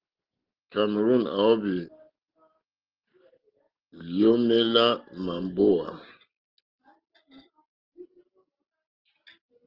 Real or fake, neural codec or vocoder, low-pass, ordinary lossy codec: real; none; 5.4 kHz; Opus, 16 kbps